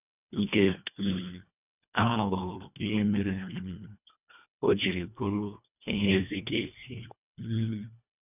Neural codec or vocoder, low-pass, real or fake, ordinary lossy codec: codec, 24 kHz, 1.5 kbps, HILCodec; 3.6 kHz; fake; none